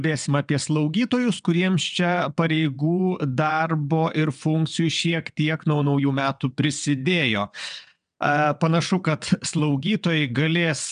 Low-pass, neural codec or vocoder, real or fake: 9.9 kHz; vocoder, 22.05 kHz, 80 mel bands, WaveNeXt; fake